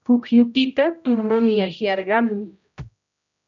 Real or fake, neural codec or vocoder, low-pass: fake; codec, 16 kHz, 0.5 kbps, X-Codec, HuBERT features, trained on general audio; 7.2 kHz